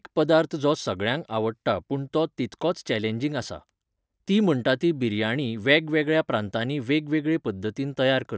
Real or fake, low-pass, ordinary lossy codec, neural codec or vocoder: real; none; none; none